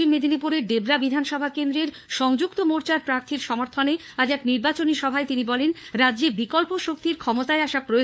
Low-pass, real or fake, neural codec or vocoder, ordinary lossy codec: none; fake; codec, 16 kHz, 4 kbps, FunCodec, trained on LibriTTS, 50 frames a second; none